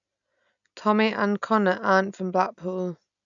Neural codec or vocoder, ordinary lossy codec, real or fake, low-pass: none; none; real; 7.2 kHz